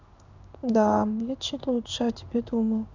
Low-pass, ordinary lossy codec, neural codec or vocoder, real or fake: 7.2 kHz; none; codec, 16 kHz in and 24 kHz out, 1 kbps, XY-Tokenizer; fake